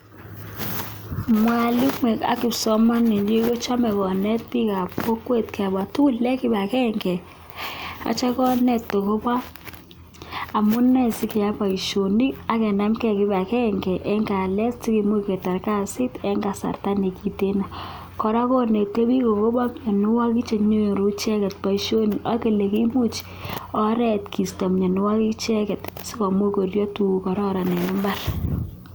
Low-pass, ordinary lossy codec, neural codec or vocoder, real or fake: none; none; none; real